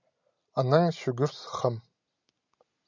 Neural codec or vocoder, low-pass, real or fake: none; 7.2 kHz; real